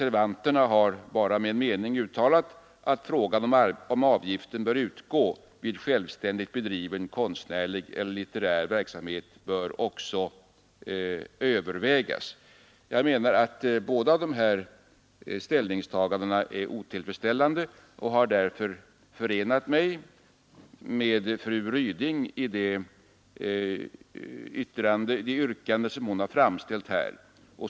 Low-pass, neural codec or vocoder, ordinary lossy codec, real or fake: none; none; none; real